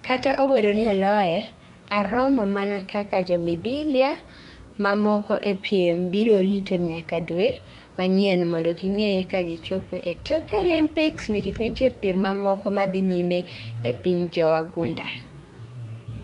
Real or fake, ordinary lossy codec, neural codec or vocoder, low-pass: fake; none; codec, 24 kHz, 1 kbps, SNAC; 10.8 kHz